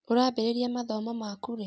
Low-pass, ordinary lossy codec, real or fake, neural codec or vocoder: none; none; real; none